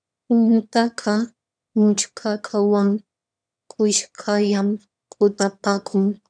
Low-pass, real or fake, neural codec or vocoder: 9.9 kHz; fake; autoencoder, 22.05 kHz, a latent of 192 numbers a frame, VITS, trained on one speaker